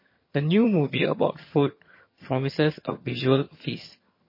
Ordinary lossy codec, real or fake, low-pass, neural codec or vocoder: MP3, 24 kbps; fake; 5.4 kHz; vocoder, 22.05 kHz, 80 mel bands, HiFi-GAN